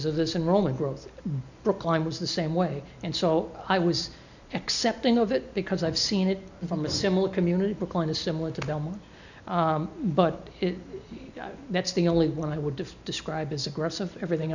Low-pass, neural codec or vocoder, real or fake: 7.2 kHz; none; real